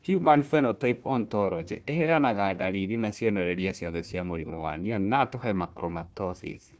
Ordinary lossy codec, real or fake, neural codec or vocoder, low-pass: none; fake; codec, 16 kHz, 1 kbps, FunCodec, trained on Chinese and English, 50 frames a second; none